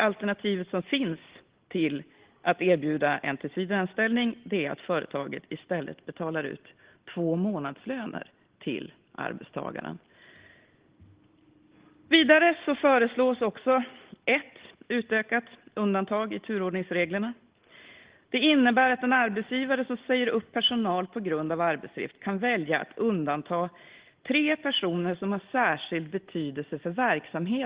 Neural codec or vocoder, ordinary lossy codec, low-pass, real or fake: none; Opus, 16 kbps; 3.6 kHz; real